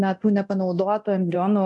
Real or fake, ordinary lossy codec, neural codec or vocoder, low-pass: fake; MP3, 96 kbps; codec, 24 kHz, 0.9 kbps, DualCodec; 10.8 kHz